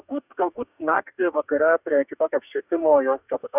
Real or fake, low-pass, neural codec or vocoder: fake; 3.6 kHz; codec, 44.1 kHz, 2.6 kbps, DAC